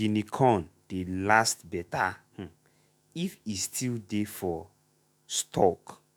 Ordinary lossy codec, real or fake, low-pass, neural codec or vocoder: none; fake; none; autoencoder, 48 kHz, 128 numbers a frame, DAC-VAE, trained on Japanese speech